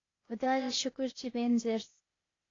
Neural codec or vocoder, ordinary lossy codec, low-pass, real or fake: codec, 16 kHz, 0.8 kbps, ZipCodec; AAC, 32 kbps; 7.2 kHz; fake